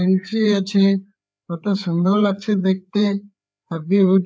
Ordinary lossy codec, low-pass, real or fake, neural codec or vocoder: none; none; fake; codec, 16 kHz, 4 kbps, FreqCodec, larger model